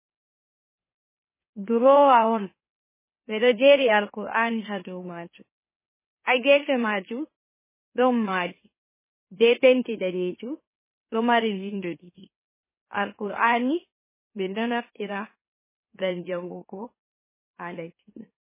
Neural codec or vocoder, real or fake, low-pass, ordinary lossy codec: autoencoder, 44.1 kHz, a latent of 192 numbers a frame, MeloTTS; fake; 3.6 kHz; MP3, 16 kbps